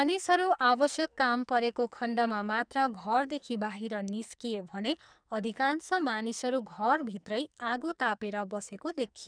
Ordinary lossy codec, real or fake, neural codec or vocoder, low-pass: none; fake; codec, 44.1 kHz, 2.6 kbps, SNAC; 9.9 kHz